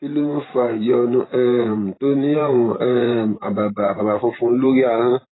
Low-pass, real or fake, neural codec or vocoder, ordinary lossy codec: 7.2 kHz; fake; vocoder, 44.1 kHz, 128 mel bands every 512 samples, BigVGAN v2; AAC, 16 kbps